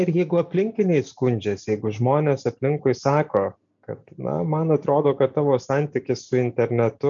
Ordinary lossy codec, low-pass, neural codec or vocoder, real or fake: MP3, 64 kbps; 7.2 kHz; none; real